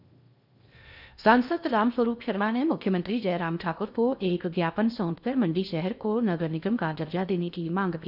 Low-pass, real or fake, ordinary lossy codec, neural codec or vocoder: 5.4 kHz; fake; none; codec, 16 kHz in and 24 kHz out, 0.6 kbps, FocalCodec, streaming, 2048 codes